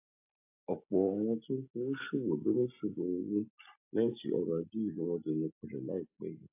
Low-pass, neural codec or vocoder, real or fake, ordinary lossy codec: 3.6 kHz; none; real; AAC, 32 kbps